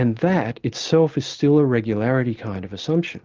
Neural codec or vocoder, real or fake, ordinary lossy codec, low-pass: none; real; Opus, 32 kbps; 7.2 kHz